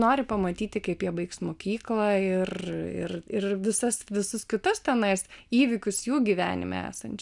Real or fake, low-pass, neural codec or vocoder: real; 10.8 kHz; none